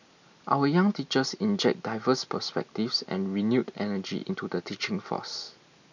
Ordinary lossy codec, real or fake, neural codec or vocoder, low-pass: none; real; none; 7.2 kHz